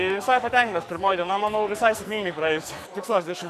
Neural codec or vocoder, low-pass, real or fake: codec, 44.1 kHz, 2.6 kbps, SNAC; 14.4 kHz; fake